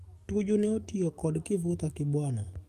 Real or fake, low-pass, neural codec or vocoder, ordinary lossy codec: fake; 14.4 kHz; codec, 44.1 kHz, 7.8 kbps, DAC; Opus, 32 kbps